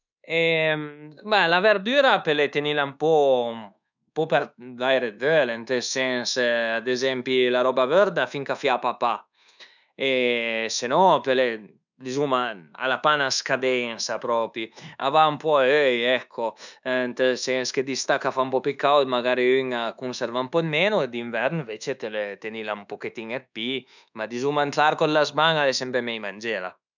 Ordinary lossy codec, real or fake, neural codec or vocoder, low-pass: none; fake; codec, 24 kHz, 1.2 kbps, DualCodec; 7.2 kHz